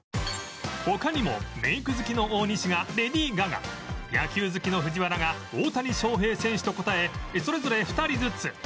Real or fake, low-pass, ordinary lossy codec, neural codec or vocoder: real; none; none; none